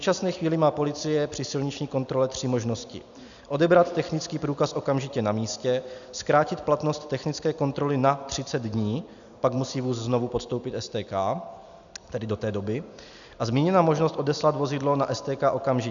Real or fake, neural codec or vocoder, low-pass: real; none; 7.2 kHz